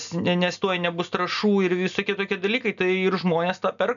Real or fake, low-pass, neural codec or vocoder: real; 7.2 kHz; none